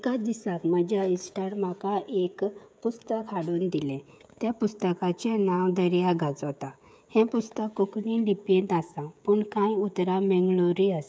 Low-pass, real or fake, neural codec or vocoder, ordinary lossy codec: none; fake; codec, 16 kHz, 16 kbps, FreqCodec, smaller model; none